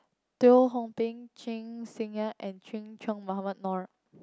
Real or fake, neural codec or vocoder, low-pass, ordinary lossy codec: real; none; none; none